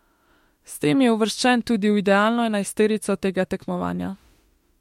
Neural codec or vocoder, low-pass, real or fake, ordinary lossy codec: autoencoder, 48 kHz, 32 numbers a frame, DAC-VAE, trained on Japanese speech; 19.8 kHz; fake; MP3, 64 kbps